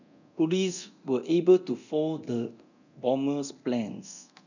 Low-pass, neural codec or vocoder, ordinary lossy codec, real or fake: 7.2 kHz; codec, 24 kHz, 0.9 kbps, DualCodec; none; fake